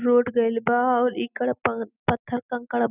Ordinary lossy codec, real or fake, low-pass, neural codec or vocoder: none; real; 3.6 kHz; none